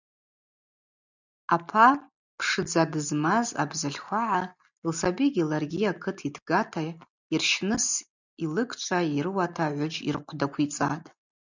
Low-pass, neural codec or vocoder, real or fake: 7.2 kHz; none; real